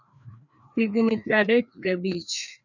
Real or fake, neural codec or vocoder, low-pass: fake; codec, 16 kHz, 2 kbps, FreqCodec, larger model; 7.2 kHz